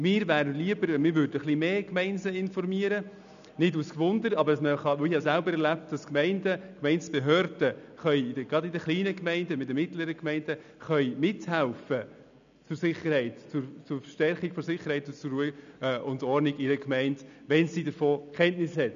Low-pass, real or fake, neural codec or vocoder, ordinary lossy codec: 7.2 kHz; real; none; none